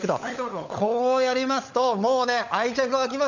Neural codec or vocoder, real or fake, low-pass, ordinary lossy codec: codec, 16 kHz, 16 kbps, FunCodec, trained on LibriTTS, 50 frames a second; fake; 7.2 kHz; none